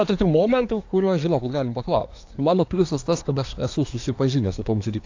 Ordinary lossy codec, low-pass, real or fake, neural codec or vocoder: AAC, 48 kbps; 7.2 kHz; fake; codec, 24 kHz, 1 kbps, SNAC